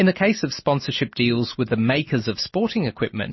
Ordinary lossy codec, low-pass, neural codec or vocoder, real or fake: MP3, 24 kbps; 7.2 kHz; none; real